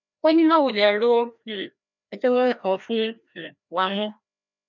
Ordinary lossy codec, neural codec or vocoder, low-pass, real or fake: none; codec, 16 kHz, 1 kbps, FreqCodec, larger model; 7.2 kHz; fake